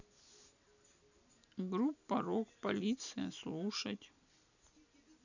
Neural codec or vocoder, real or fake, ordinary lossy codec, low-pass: none; real; none; 7.2 kHz